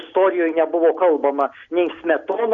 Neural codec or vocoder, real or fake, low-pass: none; real; 7.2 kHz